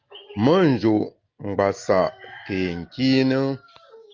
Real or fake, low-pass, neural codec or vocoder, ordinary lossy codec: real; 7.2 kHz; none; Opus, 24 kbps